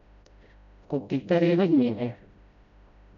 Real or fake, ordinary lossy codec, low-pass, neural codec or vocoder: fake; none; 7.2 kHz; codec, 16 kHz, 0.5 kbps, FreqCodec, smaller model